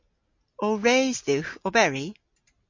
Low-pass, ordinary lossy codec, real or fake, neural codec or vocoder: 7.2 kHz; MP3, 48 kbps; real; none